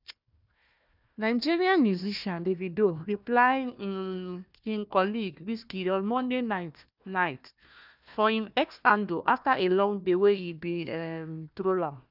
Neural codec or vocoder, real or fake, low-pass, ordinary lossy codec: codec, 16 kHz, 1 kbps, FunCodec, trained on Chinese and English, 50 frames a second; fake; 5.4 kHz; none